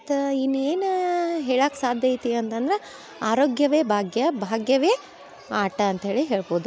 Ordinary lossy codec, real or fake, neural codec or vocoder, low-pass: none; real; none; none